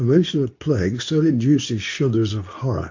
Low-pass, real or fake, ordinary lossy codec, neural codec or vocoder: 7.2 kHz; fake; AAC, 48 kbps; codec, 24 kHz, 0.9 kbps, WavTokenizer, medium speech release version 2